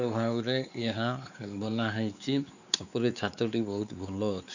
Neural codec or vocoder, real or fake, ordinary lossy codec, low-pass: codec, 16 kHz, 4 kbps, X-Codec, WavLM features, trained on Multilingual LibriSpeech; fake; none; 7.2 kHz